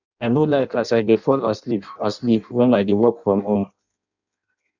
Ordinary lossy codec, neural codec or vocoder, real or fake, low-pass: none; codec, 16 kHz in and 24 kHz out, 0.6 kbps, FireRedTTS-2 codec; fake; 7.2 kHz